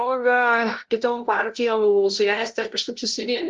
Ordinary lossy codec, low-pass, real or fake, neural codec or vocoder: Opus, 16 kbps; 7.2 kHz; fake; codec, 16 kHz, 0.5 kbps, FunCodec, trained on LibriTTS, 25 frames a second